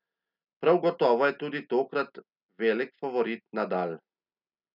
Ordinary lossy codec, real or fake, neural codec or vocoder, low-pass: none; real; none; 5.4 kHz